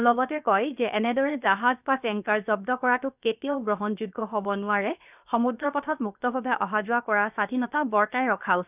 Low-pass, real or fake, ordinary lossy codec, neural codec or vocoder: 3.6 kHz; fake; none; codec, 16 kHz, 0.7 kbps, FocalCodec